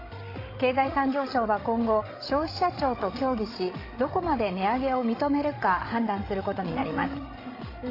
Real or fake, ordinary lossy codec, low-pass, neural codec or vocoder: fake; AAC, 24 kbps; 5.4 kHz; codec, 16 kHz, 16 kbps, FreqCodec, larger model